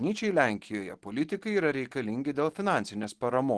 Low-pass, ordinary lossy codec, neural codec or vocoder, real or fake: 10.8 kHz; Opus, 16 kbps; none; real